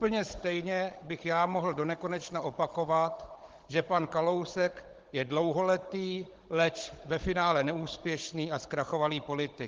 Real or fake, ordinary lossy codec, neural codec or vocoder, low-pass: fake; Opus, 16 kbps; codec, 16 kHz, 16 kbps, FunCodec, trained on Chinese and English, 50 frames a second; 7.2 kHz